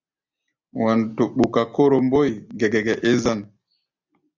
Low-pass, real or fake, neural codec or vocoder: 7.2 kHz; real; none